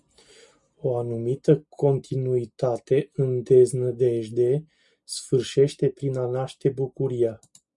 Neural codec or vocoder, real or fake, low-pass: none; real; 10.8 kHz